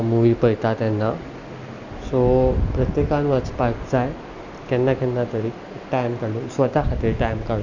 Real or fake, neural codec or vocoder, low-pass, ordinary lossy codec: real; none; 7.2 kHz; none